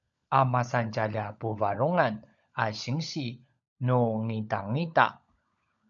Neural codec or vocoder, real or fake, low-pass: codec, 16 kHz, 16 kbps, FunCodec, trained on LibriTTS, 50 frames a second; fake; 7.2 kHz